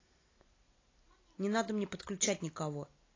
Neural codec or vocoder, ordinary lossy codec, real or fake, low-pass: none; AAC, 32 kbps; real; 7.2 kHz